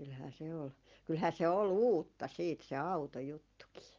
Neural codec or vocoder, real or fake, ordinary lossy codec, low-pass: none; real; Opus, 24 kbps; 7.2 kHz